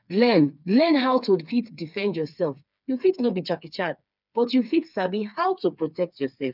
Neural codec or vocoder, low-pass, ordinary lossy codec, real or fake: codec, 16 kHz, 4 kbps, FreqCodec, smaller model; 5.4 kHz; none; fake